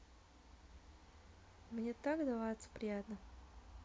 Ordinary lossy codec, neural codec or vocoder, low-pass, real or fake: none; none; none; real